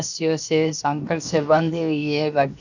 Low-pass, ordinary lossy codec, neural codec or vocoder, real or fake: 7.2 kHz; none; codec, 16 kHz, 0.7 kbps, FocalCodec; fake